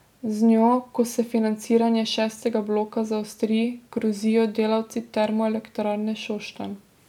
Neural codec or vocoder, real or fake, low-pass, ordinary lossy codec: none; real; 19.8 kHz; none